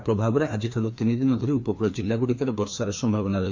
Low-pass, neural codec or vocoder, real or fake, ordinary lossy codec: 7.2 kHz; codec, 16 kHz, 2 kbps, FreqCodec, larger model; fake; MP3, 48 kbps